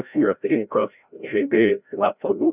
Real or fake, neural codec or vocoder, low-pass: fake; codec, 16 kHz, 0.5 kbps, FreqCodec, larger model; 3.6 kHz